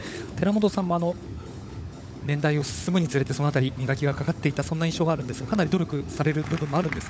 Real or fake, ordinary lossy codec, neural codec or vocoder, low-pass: fake; none; codec, 16 kHz, 16 kbps, FunCodec, trained on LibriTTS, 50 frames a second; none